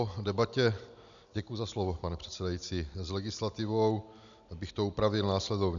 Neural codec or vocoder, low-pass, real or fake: none; 7.2 kHz; real